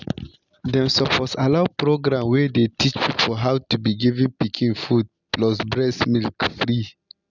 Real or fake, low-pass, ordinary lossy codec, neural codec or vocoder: real; 7.2 kHz; none; none